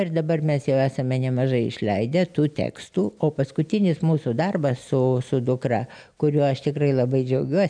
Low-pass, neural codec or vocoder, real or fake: 9.9 kHz; none; real